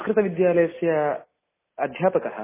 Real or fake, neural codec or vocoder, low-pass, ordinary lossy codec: real; none; 3.6 kHz; MP3, 16 kbps